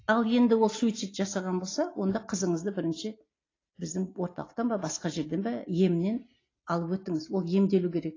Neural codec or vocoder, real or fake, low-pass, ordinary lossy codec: none; real; 7.2 kHz; AAC, 32 kbps